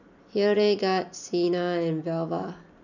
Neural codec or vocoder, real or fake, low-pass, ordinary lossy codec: none; real; 7.2 kHz; none